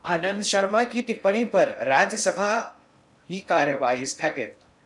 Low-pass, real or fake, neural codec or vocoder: 10.8 kHz; fake; codec, 16 kHz in and 24 kHz out, 0.6 kbps, FocalCodec, streaming, 2048 codes